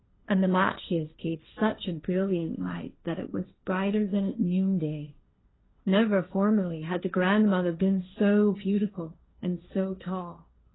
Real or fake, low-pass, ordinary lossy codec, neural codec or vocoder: fake; 7.2 kHz; AAC, 16 kbps; codec, 16 kHz, 1.1 kbps, Voila-Tokenizer